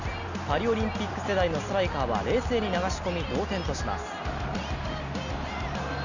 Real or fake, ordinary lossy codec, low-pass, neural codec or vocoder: real; none; 7.2 kHz; none